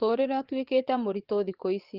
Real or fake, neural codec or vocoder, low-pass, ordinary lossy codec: fake; vocoder, 24 kHz, 100 mel bands, Vocos; 5.4 kHz; Opus, 16 kbps